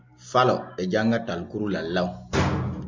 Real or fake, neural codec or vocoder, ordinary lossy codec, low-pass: real; none; MP3, 64 kbps; 7.2 kHz